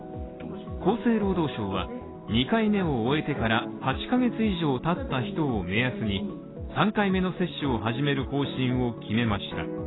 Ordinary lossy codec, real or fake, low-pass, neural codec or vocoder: AAC, 16 kbps; real; 7.2 kHz; none